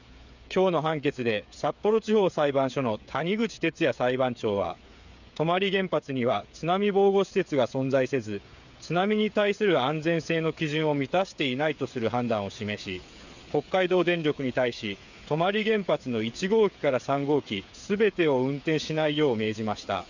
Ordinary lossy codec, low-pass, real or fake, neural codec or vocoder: none; 7.2 kHz; fake; codec, 16 kHz, 8 kbps, FreqCodec, smaller model